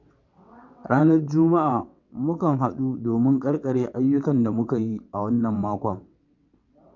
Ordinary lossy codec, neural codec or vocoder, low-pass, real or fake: none; vocoder, 22.05 kHz, 80 mel bands, WaveNeXt; 7.2 kHz; fake